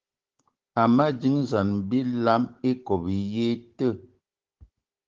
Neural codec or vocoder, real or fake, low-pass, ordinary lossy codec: codec, 16 kHz, 16 kbps, FunCodec, trained on Chinese and English, 50 frames a second; fake; 7.2 kHz; Opus, 16 kbps